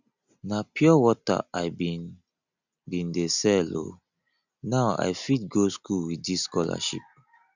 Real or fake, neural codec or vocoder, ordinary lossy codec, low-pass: real; none; none; 7.2 kHz